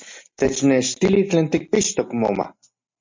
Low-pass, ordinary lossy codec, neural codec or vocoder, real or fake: 7.2 kHz; MP3, 64 kbps; none; real